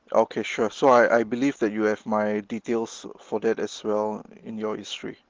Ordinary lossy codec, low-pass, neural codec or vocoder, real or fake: Opus, 16 kbps; 7.2 kHz; none; real